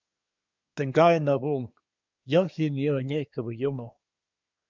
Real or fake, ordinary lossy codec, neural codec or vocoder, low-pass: fake; MP3, 64 kbps; codec, 24 kHz, 1 kbps, SNAC; 7.2 kHz